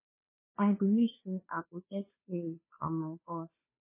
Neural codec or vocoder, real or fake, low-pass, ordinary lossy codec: codec, 16 kHz, 2 kbps, FunCodec, trained on Chinese and English, 25 frames a second; fake; 3.6 kHz; MP3, 16 kbps